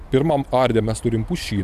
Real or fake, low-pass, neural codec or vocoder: fake; 14.4 kHz; vocoder, 44.1 kHz, 128 mel bands every 256 samples, BigVGAN v2